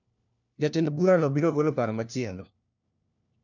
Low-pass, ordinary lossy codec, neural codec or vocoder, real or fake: 7.2 kHz; none; codec, 16 kHz, 1 kbps, FunCodec, trained on LibriTTS, 50 frames a second; fake